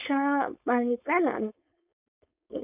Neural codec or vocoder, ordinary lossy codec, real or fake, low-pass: codec, 16 kHz, 4.8 kbps, FACodec; none; fake; 3.6 kHz